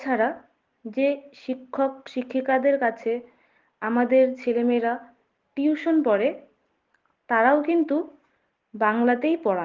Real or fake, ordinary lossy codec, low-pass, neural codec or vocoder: real; Opus, 16 kbps; 7.2 kHz; none